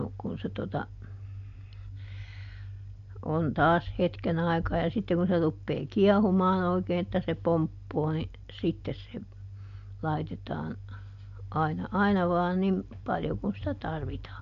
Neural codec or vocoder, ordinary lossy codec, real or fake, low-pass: none; MP3, 64 kbps; real; 7.2 kHz